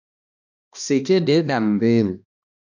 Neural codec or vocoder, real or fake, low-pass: codec, 16 kHz, 1 kbps, X-Codec, HuBERT features, trained on balanced general audio; fake; 7.2 kHz